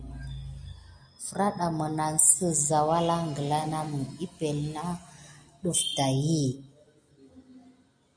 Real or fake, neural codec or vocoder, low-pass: real; none; 9.9 kHz